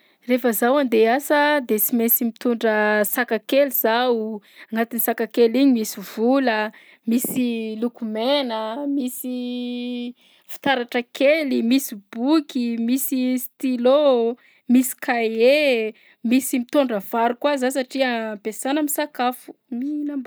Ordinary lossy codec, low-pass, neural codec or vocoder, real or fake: none; none; none; real